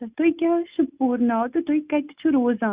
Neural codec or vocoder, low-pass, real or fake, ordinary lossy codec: none; 3.6 kHz; real; Opus, 64 kbps